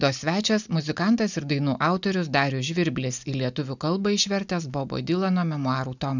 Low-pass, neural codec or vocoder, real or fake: 7.2 kHz; none; real